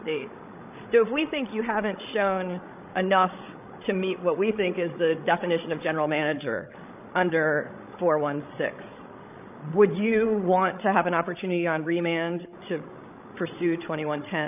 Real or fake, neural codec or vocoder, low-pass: fake; codec, 16 kHz, 16 kbps, FreqCodec, larger model; 3.6 kHz